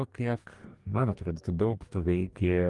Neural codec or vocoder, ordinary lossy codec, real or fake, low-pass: codec, 44.1 kHz, 2.6 kbps, DAC; Opus, 32 kbps; fake; 10.8 kHz